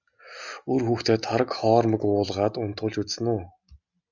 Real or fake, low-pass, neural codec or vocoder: real; 7.2 kHz; none